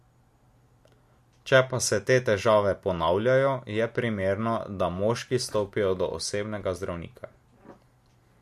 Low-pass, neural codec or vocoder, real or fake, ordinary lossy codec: 14.4 kHz; none; real; MP3, 64 kbps